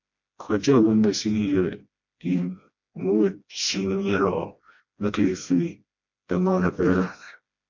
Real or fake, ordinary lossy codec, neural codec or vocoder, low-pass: fake; MP3, 48 kbps; codec, 16 kHz, 1 kbps, FreqCodec, smaller model; 7.2 kHz